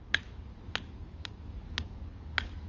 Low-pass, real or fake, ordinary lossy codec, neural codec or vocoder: 7.2 kHz; fake; Opus, 32 kbps; codec, 44.1 kHz, 7.8 kbps, DAC